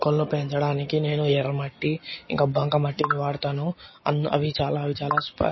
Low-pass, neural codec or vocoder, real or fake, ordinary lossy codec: 7.2 kHz; none; real; MP3, 24 kbps